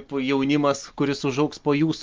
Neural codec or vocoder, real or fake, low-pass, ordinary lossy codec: none; real; 7.2 kHz; Opus, 24 kbps